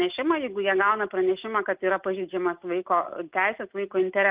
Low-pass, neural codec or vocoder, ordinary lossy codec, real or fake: 3.6 kHz; none; Opus, 32 kbps; real